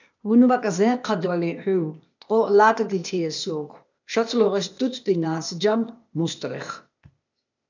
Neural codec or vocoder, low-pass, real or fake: codec, 16 kHz, 0.8 kbps, ZipCodec; 7.2 kHz; fake